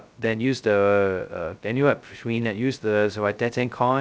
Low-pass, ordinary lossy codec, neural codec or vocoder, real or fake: none; none; codec, 16 kHz, 0.2 kbps, FocalCodec; fake